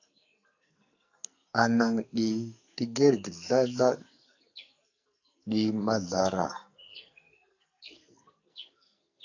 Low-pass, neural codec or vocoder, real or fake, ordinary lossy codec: 7.2 kHz; codec, 44.1 kHz, 2.6 kbps, SNAC; fake; AAC, 48 kbps